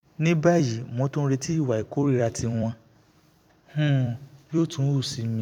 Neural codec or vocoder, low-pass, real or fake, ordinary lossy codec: vocoder, 44.1 kHz, 128 mel bands every 256 samples, BigVGAN v2; 19.8 kHz; fake; none